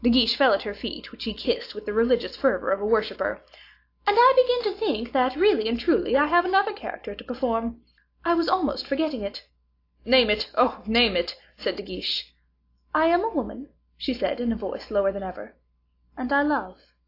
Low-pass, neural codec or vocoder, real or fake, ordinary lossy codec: 5.4 kHz; none; real; AAC, 32 kbps